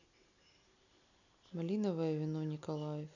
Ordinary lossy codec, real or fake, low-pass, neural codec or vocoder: none; real; 7.2 kHz; none